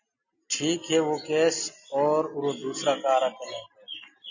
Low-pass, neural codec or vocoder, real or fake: 7.2 kHz; none; real